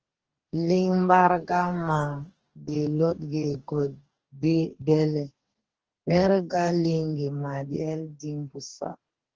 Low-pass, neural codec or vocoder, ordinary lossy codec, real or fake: 7.2 kHz; codec, 44.1 kHz, 2.6 kbps, DAC; Opus, 16 kbps; fake